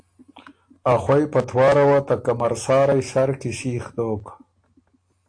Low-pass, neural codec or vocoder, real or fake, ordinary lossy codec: 9.9 kHz; none; real; AAC, 48 kbps